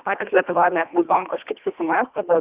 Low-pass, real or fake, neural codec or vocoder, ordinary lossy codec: 3.6 kHz; fake; codec, 24 kHz, 1.5 kbps, HILCodec; Opus, 64 kbps